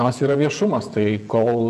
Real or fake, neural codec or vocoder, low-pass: fake; vocoder, 48 kHz, 128 mel bands, Vocos; 14.4 kHz